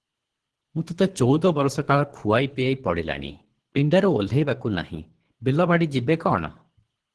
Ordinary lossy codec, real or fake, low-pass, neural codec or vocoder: Opus, 16 kbps; fake; 10.8 kHz; codec, 24 kHz, 3 kbps, HILCodec